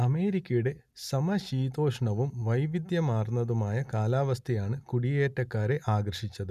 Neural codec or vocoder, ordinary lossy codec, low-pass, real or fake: none; none; 14.4 kHz; real